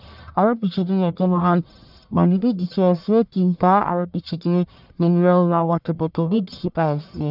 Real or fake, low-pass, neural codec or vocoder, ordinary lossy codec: fake; 5.4 kHz; codec, 44.1 kHz, 1.7 kbps, Pupu-Codec; none